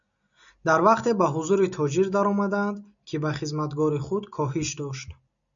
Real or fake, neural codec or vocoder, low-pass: real; none; 7.2 kHz